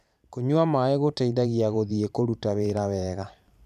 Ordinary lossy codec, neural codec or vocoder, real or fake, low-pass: none; vocoder, 44.1 kHz, 128 mel bands every 512 samples, BigVGAN v2; fake; 14.4 kHz